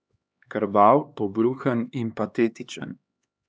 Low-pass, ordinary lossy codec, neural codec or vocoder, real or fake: none; none; codec, 16 kHz, 2 kbps, X-Codec, HuBERT features, trained on LibriSpeech; fake